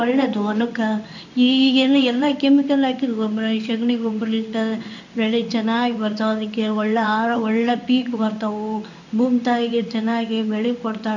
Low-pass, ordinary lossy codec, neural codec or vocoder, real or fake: 7.2 kHz; none; codec, 16 kHz in and 24 kHz out, 1 kbps, XY-Tokenizer; fake